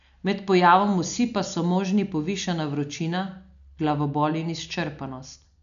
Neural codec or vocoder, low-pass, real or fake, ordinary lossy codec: none; 7.2 kHz; real; none